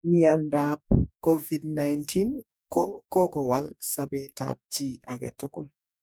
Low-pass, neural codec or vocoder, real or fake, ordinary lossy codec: none; codec, 44.1 kHz, 2.6 kbps, DAC; fake; none